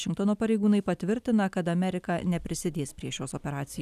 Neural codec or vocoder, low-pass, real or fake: none; 14.4 kHz; real